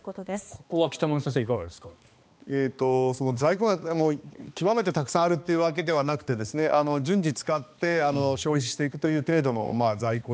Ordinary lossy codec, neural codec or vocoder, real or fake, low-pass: none; codec, 16 kHz, 2 kbps, X-Codec, HuBERT features, trained on balanced general audio; fake; none